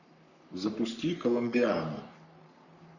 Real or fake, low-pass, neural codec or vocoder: fake; 7.2 kHz; codec, 44.1 kHz, 3.4 kbps, Pupu-Codec